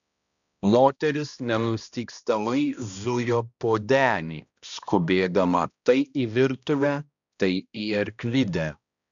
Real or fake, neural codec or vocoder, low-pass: fake; codec, 16 kHz, 1 kbps, X-Codec, HuBERT features, trained on balanced general audio; 7.2 kHz